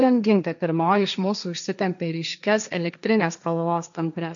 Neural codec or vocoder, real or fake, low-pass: codec, 16 kHz, 1.1 kbps, Voila-Tokenizer; fake; 7.2 kHz